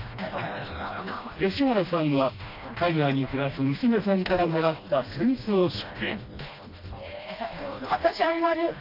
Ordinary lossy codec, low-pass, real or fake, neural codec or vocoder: AAC, 32 kbps; 5.4 kHz; fake; codec, 16 kHz, 1 kbps, FreqCodec, smaller model